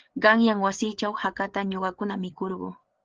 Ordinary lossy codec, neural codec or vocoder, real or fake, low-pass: Opus, 16 kbps; codec, 16 kHz, 8 kbps, FreqCodec, larger model; fake; 7.2 kHz